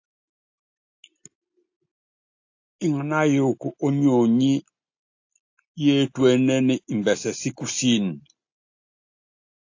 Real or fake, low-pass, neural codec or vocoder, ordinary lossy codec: real; 7.2 kHz; none; AAC, 48 kbps